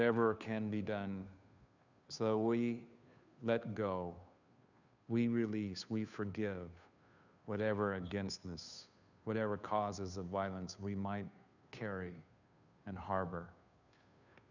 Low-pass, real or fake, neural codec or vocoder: 7.2 kHz; fake; codec, 16 kHz, 2 kbps, FunCodec, trained on Chinese and English, 25 frames a second